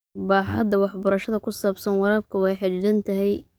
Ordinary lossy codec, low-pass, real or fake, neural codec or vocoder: none; none; fake; codec, 44.1 kHz, 7.8 kbps, DAC